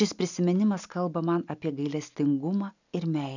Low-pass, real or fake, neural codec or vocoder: 7.2 kHz; real; none